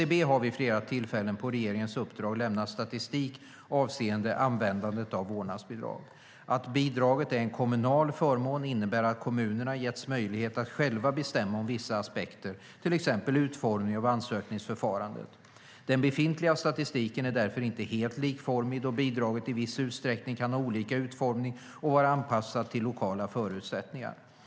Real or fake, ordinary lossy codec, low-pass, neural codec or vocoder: real; none; none; none